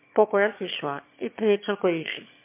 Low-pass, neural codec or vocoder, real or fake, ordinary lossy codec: 3.6 kHz; autoencoder, 22.05 kHz, a latent of 192 numbers a frame, VITS, trained on one speaker; fake; MP3, 24 kbps